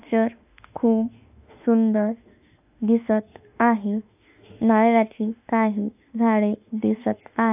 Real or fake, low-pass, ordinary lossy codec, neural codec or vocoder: fake; 3.6 kHz; AAC, 32 kbps; autoencoder, 48 kHz, 32 numbers a frame, DAC-VAE, trained on Japanese speech